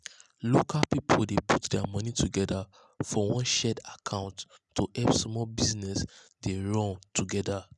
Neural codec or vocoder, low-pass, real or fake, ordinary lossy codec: none; none; real; none